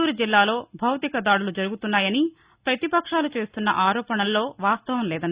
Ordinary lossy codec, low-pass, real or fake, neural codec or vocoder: Opus, 64 kbps; 3.6 kHz; real; none